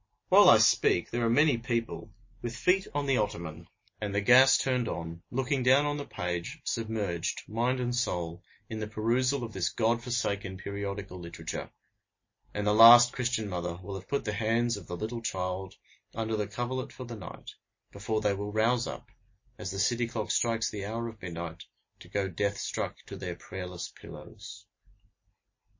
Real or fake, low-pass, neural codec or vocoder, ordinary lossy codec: real; 7.2 kHz; none; MP3, 32 kbps